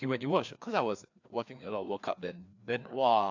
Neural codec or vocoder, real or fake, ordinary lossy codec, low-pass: codec, 16 kHz, 2 kbps, FreqCodec, larger model; fake; AAC, 48 kbps; 7.2 kHz